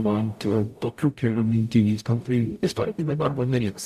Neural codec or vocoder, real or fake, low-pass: codec, 44.1 kHz, 0.9 kbps, DAC; fake; 14.4 kHz